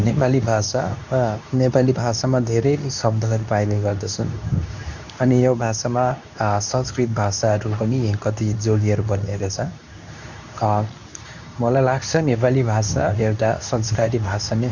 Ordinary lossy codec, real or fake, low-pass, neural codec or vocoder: none; fake; 7.2 kHz; codec, 24 kHz, 0.9 kbps, WavTokenizer, medium speech release version 2